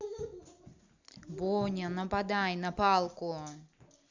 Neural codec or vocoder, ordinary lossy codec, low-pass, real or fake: none; Opus, 64 kbps; 7.2 kHz; real